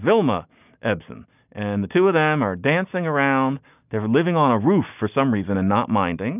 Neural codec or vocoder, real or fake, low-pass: none; real; 3.6 kHz